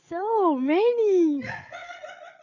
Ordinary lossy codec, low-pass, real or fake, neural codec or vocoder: none; 7.2 kHz; fake; codec, 16 kHz, 8 kbps, FreqCodec, larger model